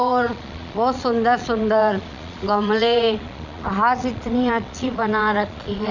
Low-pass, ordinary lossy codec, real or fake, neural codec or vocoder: 7.2 kHz; none; fake; vocoder, 22.05 kHz, 80 mel bands, Vocos